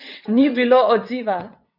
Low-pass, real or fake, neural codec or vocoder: 5.4 kHz; fake; vocoder, 22.05 kHz, 80 mel bands, WaveNeXt